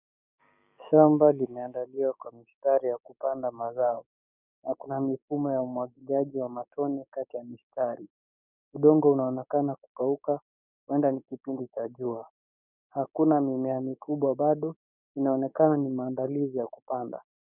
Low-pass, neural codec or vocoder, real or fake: 3.6 kHz; codec, 16 kHz, 6 kbps, DAC; fake